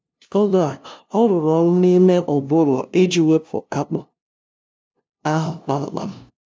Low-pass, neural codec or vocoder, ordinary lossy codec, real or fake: none; codec, 16 kHz, 0.5 kbps, FunCodec, trained on LibriTTS, 25 frames a second; none; fake